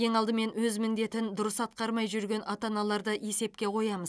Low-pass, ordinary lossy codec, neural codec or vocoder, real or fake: none; none; none; real